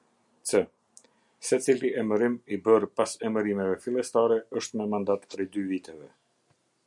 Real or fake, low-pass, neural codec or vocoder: real; 10.8 kHz; none